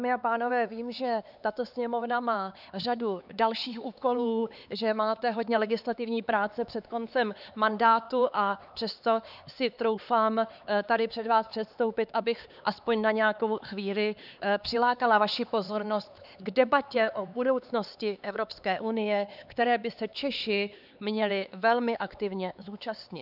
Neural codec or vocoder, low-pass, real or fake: codec, 16 kHz, 4 kbps, X-Codec, HuBERT features, trained on LibriSpeech; 5.4 kHz; fake